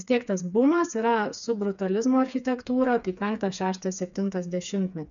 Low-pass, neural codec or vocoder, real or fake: 7.2 kHz; codec, 16 kHz, 4 kbps, FreqCodec, smaller model; fake